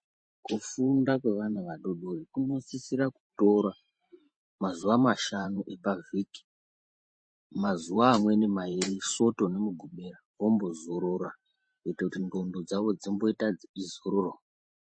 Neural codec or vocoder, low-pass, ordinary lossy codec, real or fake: vocoder, 44.1 kHz, 128 mel bands every 512 samples, BigVGAN v2; 9.9 kHz; MP3, 32 kbps; fake